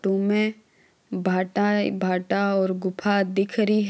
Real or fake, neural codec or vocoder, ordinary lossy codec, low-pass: real; none; none; none